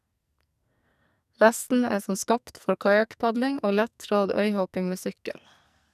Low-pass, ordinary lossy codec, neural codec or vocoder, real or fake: 14.4 kHz; none; codec, 44.1 kHz, 2.6 kbps, SNAC; fake